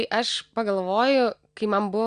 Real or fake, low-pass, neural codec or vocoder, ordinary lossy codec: real; 9.9 kHz; none; Opus, 64 kbps